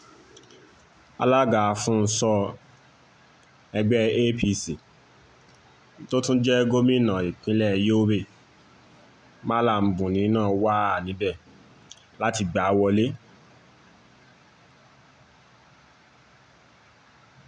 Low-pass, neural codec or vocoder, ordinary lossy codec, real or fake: 9.9 kHz; none; none; real